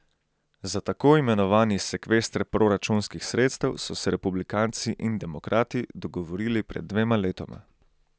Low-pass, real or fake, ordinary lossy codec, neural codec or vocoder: none; real; none; none